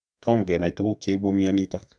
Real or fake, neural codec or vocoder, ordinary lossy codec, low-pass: fake; codec, 32 kHz, 1.9 kbps, SNAC; none; 9.9 kHz